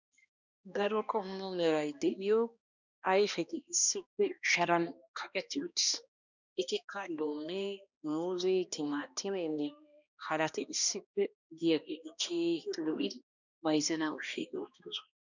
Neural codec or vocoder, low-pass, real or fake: codec, 16 kHz, 1 kbps, X-Codec, HuBERT features, trained on balanced general audio; 7.2 kHz; fake